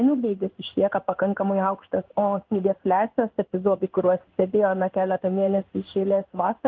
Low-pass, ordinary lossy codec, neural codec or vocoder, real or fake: 7.2 kHz; Opus, 32 kbps; codec, 16 kHz in and 24 kHz out, 1 kbps, XY-Tokenizer; fake